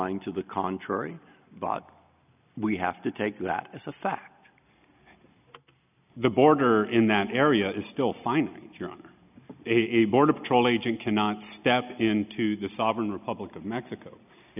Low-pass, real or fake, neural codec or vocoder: 3.6 kHz; real; none